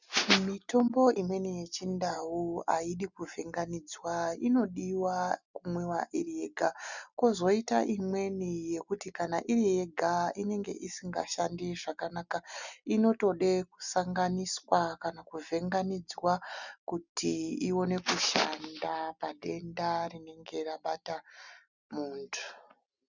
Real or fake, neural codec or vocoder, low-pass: real; none; 7.2 kHz